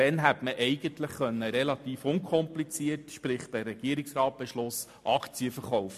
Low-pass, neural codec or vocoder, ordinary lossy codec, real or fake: 14.4 kHz; none; MP3, 64 kbps; real